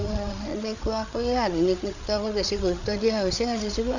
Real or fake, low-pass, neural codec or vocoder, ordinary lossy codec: fake; 7.2 kHz; codec, 16 kHz, 8 kbps, FreqCodec, larger model; none